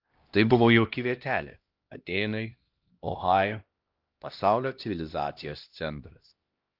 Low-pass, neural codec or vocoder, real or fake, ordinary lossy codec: 5.4 kHz; codec, 16 kHz, 1 kbps, X-Codec, HuBERT features, trained on LibriSpeech; fake; Opus, 32 kbps